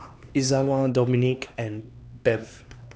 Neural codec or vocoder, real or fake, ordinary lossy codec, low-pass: codec, 16 kHz, 2 kbps, X-Codec, HuBERT features, trained on LibriSpeech; fake; none; none